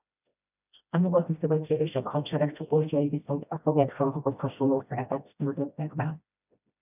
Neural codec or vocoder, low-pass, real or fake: codec, 16 kHz, 1 kbps, FreqCodec, smaller model; 3.6 kHz; fake